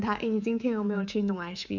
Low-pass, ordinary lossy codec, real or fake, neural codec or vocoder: 7.2 kHz; none; fake; codec, 24 kHz, 3.1 kbps, DualCodec